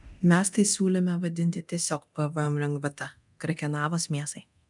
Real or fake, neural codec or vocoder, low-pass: fake; codec, 24 kHz, 0.9 kbps, DualCodec; 10.8 kHz